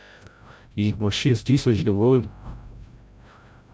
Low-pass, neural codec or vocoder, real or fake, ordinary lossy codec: none; codec, 16 kHz, 0.5 kbps, FreqCodec, larger model; fake; none